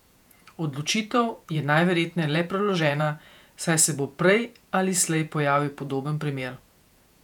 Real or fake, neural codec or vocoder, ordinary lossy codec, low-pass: fake; vocoder, 48 kHz, 128 mel bands, Vocos; none; 19.8 kHz